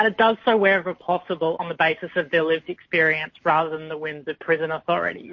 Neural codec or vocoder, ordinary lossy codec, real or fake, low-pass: none; MP3, 32 kbps; real; 7.2 kHz